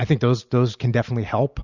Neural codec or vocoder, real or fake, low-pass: none; real; 7.2 kHz